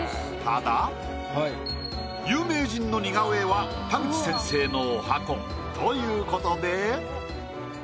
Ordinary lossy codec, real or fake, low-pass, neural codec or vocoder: none; real; none; none